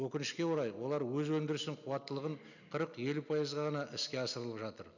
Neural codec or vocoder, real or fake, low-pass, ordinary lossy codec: none; real; 7.2 kHz; none